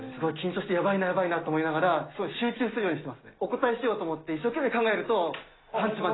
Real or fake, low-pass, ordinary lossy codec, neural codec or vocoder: real; 7.2 kHz; AAC, 16 kbps; none